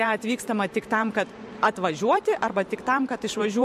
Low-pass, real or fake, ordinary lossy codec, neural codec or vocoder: 14.4 kHz; fake; MP3, 64 kbps; vocoder, 44.1 kHz, 128 mel bands every 512 samples, BigVGAN v2